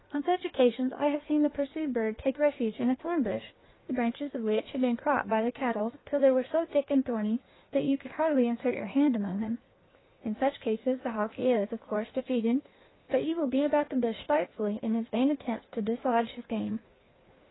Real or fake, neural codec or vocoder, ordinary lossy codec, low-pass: fake; codec, 16 kHz in and 24 kHz out, 1.1 kbps, FireRedTTS-2 codec; AAC, 16 kbps; 7.2 kHz